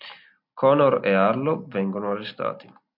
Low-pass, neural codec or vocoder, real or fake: 5.4 kHz; none; real